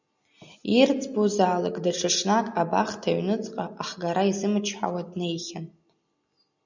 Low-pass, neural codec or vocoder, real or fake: 7.2 kHz; none; real